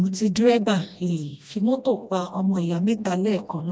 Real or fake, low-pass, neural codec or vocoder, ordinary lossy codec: fake; none; codec, 16 kHz, 1 kbps, FreqCodec, smaller model; none